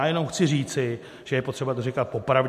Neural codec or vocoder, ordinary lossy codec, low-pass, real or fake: none; MP3, 64 kbps; 14.4 kHz; real